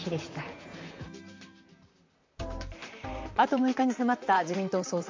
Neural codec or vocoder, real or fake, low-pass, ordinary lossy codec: vocoder, 44.1 kHz, 128 mel bands, Pupu-Vocoder; fake; 7.2 kHz; MP3, 64 kbps